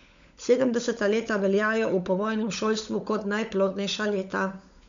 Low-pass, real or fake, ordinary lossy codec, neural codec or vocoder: 7.2 kHz; fake; MP3, 64 kbps; codec, 16 kHz, 16 kbps, FunCodec, trained on LibriTTS, 50 frames a second